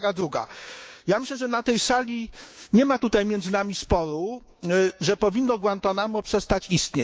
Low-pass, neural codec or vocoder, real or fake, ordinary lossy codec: none; codec, 16 kHz, 6 kbps, DAC; fake; none